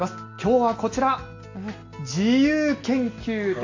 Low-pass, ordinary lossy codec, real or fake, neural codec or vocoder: 7.2 kHz; AAC, 48 kbps; real; none